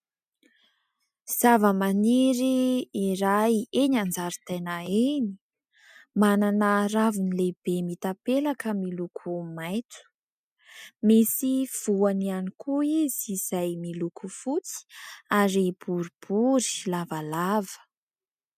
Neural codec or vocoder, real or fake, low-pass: none; real; 14.4 kHz